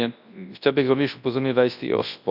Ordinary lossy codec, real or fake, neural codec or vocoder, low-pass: none; fake; codec, 24 kHz, 0.9 kbps, WavTokenizer, large speech release; 5.4 kHz